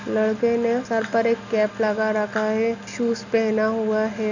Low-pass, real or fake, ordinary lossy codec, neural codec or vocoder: 7.2 kHz; real; none; none